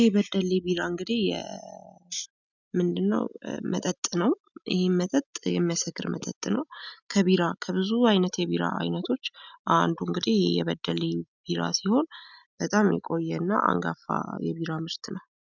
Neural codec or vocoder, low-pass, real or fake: none; 7.2 kHz; real